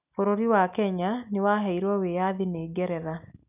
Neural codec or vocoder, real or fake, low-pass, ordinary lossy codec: none; real; 3.6 kHz; none